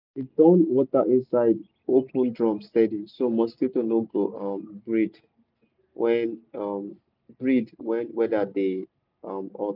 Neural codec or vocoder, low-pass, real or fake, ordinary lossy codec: none; 5.4 kHz; real; none